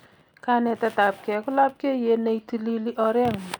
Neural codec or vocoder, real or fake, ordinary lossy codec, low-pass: none; real; none; none